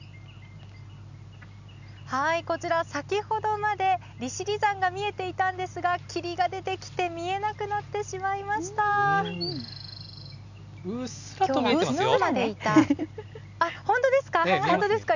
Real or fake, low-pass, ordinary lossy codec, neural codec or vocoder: real; 7.2 kHz; none; none